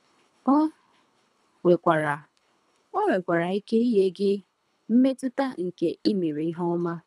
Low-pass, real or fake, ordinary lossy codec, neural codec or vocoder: none; fake; none; codec, 24 kHz, 3 kbps, HILCodec